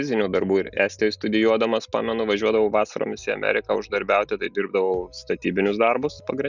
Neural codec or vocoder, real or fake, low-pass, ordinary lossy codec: none; real; 7.2 kHz; Opus, 64 kbps